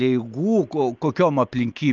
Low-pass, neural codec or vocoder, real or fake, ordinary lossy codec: 7.2 kHz; none; real; Opus, 24 kbps